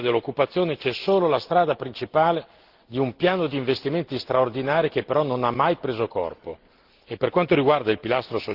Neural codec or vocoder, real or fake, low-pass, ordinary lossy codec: none; real; 5.4 kHz; Opus, 16 kbps